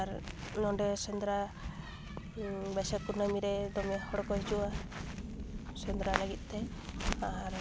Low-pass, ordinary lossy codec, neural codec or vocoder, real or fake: none; none; none; real